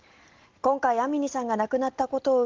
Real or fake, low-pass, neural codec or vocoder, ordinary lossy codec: real; 7.2 kHz; none; Opus, 16 kbps